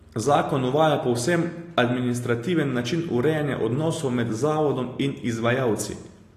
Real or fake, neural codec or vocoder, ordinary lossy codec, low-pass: real; none; AAC, 48 kbps; 14.4 kHz